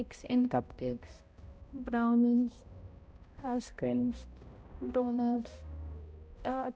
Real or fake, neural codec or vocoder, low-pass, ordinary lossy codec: fake; codec, 16 kHz, 0.5 kbps, X-Codec, HuBERT features, trained on balanced general audio; none; none